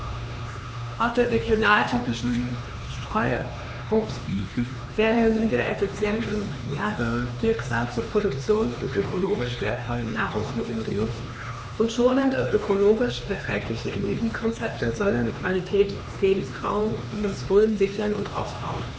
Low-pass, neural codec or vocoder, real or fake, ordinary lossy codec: none; codec, 16 kHz, 2 kbps, X-Codec, HuBERT features, trained on LibriSpeech; fake; none